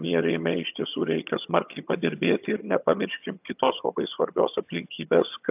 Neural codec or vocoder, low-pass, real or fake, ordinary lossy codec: vocoder, 22.05 kHz, 80 mel bands, HiFi-GAN; 3.6 kHz; fake; AAC, 32 kbps